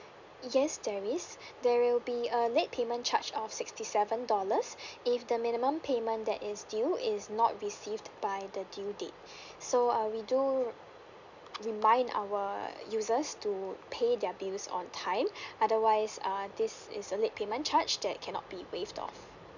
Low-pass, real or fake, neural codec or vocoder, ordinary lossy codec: 7.2 kHz; real; none; none